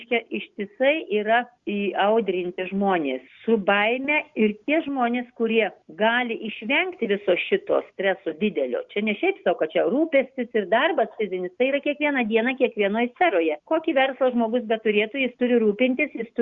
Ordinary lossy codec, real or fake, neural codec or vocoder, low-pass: Opus, 64 kbps; real; none; 7.2 kHz